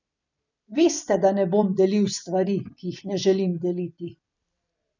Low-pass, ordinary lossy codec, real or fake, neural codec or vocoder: 7.2 kHz; none; fake; vocoder, 44.1 kHz, 128 mel bands every 256 samples, BigVGAN v2